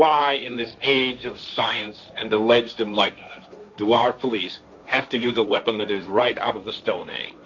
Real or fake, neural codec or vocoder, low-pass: fake; codec, 16 kHz, 1.1 kbps, Voila-Tokenizer; 7.2 kHz